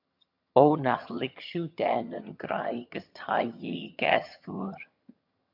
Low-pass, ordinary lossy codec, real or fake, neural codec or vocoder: 5.4 kHz; MP3, 48 kbps; fake; vocoder, 22.05 kHz, 80 mel bands, HiFi-GAN